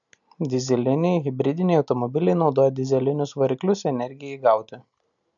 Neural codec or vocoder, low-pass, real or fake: none; 7.2 kHz; real